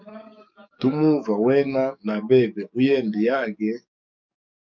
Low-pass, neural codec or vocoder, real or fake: 7.2 kHz; codec, 44.1 kHz, 7.8 kbps, DAC; fake